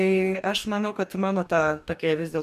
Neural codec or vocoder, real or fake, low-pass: codec, 44.1 kHz, 2.6 kbps, DAC; fake; 14.4 kHz